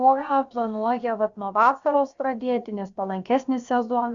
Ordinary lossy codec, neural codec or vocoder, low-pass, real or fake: Opus, 64 kbps; codec, 16 kHz, about 1 kbps, DyCAST, with the encoder's durations; 7.2 kHz; fake